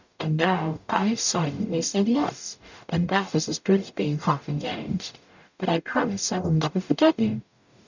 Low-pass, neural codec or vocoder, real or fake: 7.2 kHz; codec, 44.1 kHz, 0.9 kbps, DAC; fake